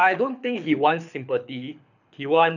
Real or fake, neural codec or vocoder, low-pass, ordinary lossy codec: fake; codec, 24 kHz, 6 kbps, HILCodec; 7.2 kHz; none